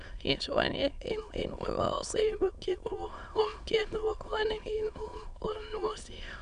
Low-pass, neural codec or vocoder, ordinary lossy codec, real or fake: 9.9 kHz; autoencoder, 22.05 kHz, a latent of 192 numbers a frame, VITS, trained on many speakers; none; fake